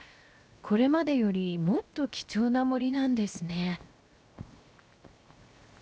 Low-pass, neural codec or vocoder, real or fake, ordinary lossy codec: none; codec, 16 kHz, 0.7 kbps, FocalCodec; fake; none